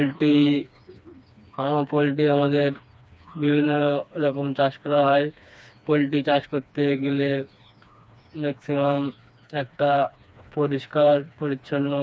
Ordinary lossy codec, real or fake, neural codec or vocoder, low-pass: none; fake; codec, 16 kHz, 2 kbps, FreqCodec, smaller model; none